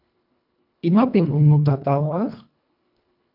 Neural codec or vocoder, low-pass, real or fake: codec, 24 kHz, 1.5 kbps, HILCodec; 5.4 kHz; fake